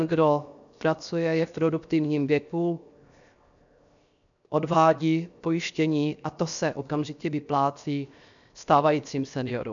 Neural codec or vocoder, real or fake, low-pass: codec, 16 kHz, 0.7 kbps, FocalCodec; fake; 7.2 kHz